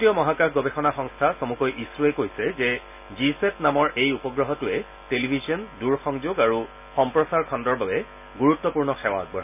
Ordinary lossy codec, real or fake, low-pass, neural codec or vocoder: none; real; 3.6 kHz; none